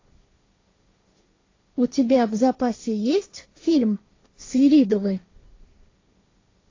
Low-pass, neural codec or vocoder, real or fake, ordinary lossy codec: 7.2 kHz; codec, 16 kHz, 1.1 kbps, Voila-Tokenizer; fake; AAC, 32 kbps